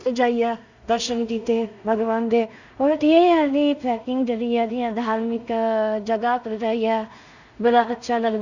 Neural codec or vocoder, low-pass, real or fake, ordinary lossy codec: codec, 16 kHz in and 24 kHz out, 0.4 kbps, LongCat-Audio-Codec, two codebook decoder; 7.2 kHz; fake; none